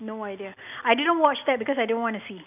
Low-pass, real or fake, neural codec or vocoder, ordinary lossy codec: 3.6 kHz; real; none; none